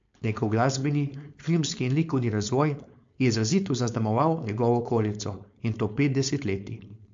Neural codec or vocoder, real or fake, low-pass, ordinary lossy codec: codec, 16 kHz, 4.8 kbps, FACodec; fake; 7.2 kHz; MP3, 48 kbps